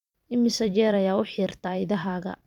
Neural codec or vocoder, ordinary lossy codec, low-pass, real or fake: vocoder, 44.1 kHz, 128 mel bands every 256 samples, BigVGAN v2; none; 19.8 kHz; fake